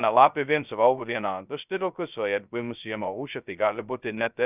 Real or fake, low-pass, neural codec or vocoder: fake; 3.6 kHz; codec, 16 kHz, 0.2 kbps, FocalCodec